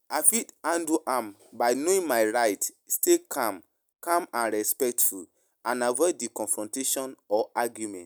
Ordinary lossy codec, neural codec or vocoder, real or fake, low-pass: none; none; real; none